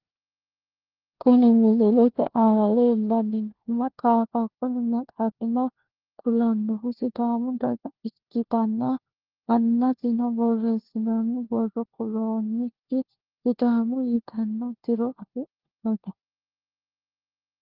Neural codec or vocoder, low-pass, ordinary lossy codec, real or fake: codec, 16 kHz, 1 kbps, FunCodec, trained on LibriTTS, 50 frames a second; 5.4 kHz; Opus, 16 kbps; fake